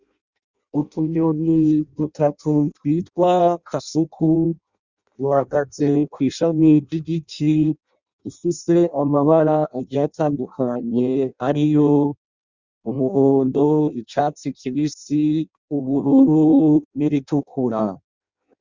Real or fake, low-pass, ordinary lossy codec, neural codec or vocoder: fake; 7.2 kHz; Opus, 64 kbps; codec, 16 kHz in and 24 kHz out, 0.6 kbps, FireRedTTS-2 codec